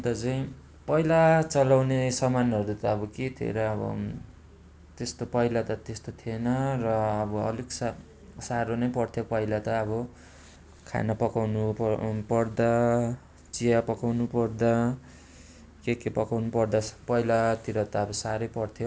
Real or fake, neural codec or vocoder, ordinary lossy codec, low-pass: real; none; none; none